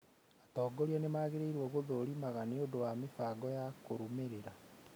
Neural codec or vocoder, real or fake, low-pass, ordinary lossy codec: none; real; none; none